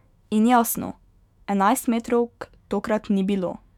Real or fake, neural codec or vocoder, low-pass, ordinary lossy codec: fake; autoencoder, 48 kHz, 128 numbers a frame, DAC-VAE, trained on Japanese speech; 19.8 kHz; none